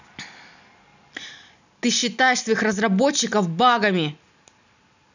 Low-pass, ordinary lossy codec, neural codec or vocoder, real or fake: 7.2 kHz; none; none; real